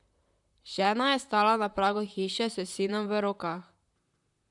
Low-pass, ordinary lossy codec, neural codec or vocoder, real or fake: 10.8 kHz; none; vocoder, 44.1 kHz, 128 mel bands, Pupu-Vocoder; fake